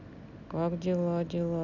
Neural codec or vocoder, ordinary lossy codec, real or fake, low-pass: none; none; real; 7.2 kHz